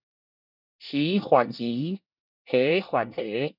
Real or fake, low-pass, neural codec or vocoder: fake; 5.4 kHz; codec, 24 kHz, 1 kbps, SNAC